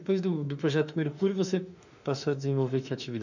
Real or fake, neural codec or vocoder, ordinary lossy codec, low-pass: fake; autoencoder, 48 kHz, 32 numbers a frame, DAC-VAE, trained on Japanese speech; none; 7.2 kHz